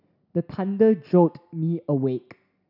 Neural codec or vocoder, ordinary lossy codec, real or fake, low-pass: none; none; real; 5.4 kHz